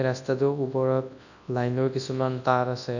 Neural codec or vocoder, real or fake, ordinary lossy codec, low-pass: codec, 24 kHz, 0.9 kbps, WavTokenizer, large speech release; fake; none; 7.2 kHz